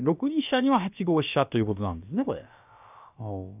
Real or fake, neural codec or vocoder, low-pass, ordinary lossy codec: fake; codec, 16 kHz, about 1 kbps, DyCAST, with the encoder's durations; 3.6 kHz; none